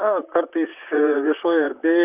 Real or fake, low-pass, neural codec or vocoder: fake; 3.6 kHz; vocoder, 44.1 kHz, 128 mel bands, Pupu-Vocoder